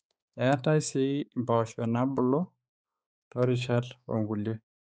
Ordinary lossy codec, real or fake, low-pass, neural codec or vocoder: none; fake; none; codec, 16 kHz, 4 kbps, X-Codec, HuBERT features, trained on balanced general audio